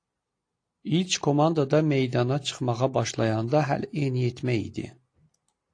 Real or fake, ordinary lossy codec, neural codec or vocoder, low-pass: real; AAC, 48 kbps; none; 9.9 kHz